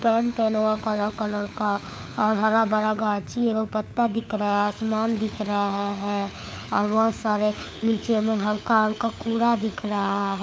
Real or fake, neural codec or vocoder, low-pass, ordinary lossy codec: fake; codec, 16 kHz, 4 kbps, FunCodec, trained on LibriTTS, 50 frames a second; none; none